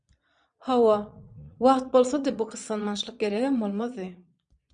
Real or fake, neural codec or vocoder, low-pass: fake; vocoder, 22.05 kHz, 80 mel bands, Vocos; 9.9 kHz